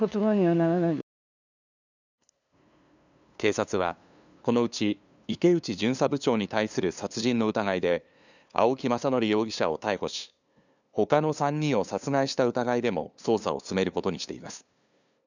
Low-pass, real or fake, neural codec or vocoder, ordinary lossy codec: 7.2 kHz; fake; codec, 16 kHz, 2 kbps, FunCodec, trained on LibriTTS, 25 frames a second; none